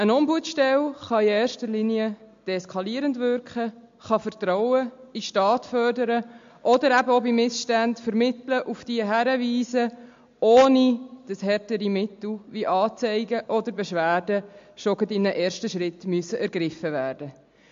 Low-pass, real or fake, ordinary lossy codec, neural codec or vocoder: 7.2 kHz; real; MP3, 48 kbps; none